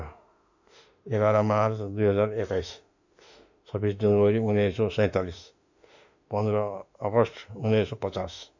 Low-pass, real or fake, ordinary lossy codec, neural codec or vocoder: 7.2 kHz; fake; none; autoencoder, 48 kHz, 32 numbers a frame, DAC-VAE, trained on Japanese speech